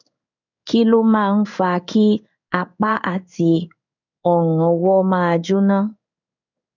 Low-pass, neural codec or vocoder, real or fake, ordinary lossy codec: 7.2 kHz; codec, 16 kHz in and 24 kHz out, 1 kbps, XY-Tokenizer; fake; none